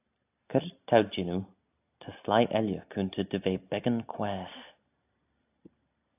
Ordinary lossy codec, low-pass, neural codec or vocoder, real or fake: AAC, 32 kbps; 3.6 kHz; none; real